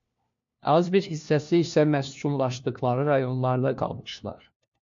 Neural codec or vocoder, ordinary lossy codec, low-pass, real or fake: codec, 16 kHz, 1 kbps, FunCodec, trained on LibriTTS, 50 frames a second; MP3, 48 kbps; 7.2 kHz; fake